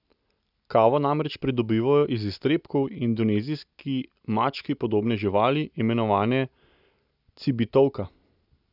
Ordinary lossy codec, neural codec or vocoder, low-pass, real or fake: none; none; 5.4 kHz; real